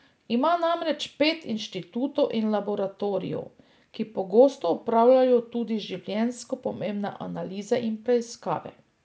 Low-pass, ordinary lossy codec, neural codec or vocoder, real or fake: none; none; none; real